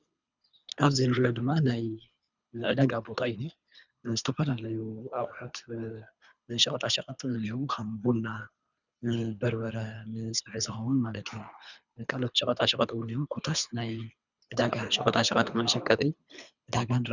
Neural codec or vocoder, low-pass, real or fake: codec, 24 kHz, 3 kbps, HILCodec; 7.2 kHz; fake